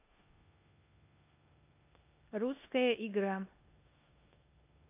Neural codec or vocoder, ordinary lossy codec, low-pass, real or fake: codec, 16 kHz in and 24 kHz out, 0.9 kbps, LongCat-Audio-Codec, four codebook decoder; AAC, 32 kbps; 3.6 kHz; fake